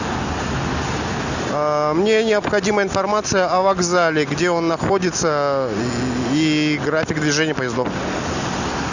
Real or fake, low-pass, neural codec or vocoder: real; 7.2 kHz; none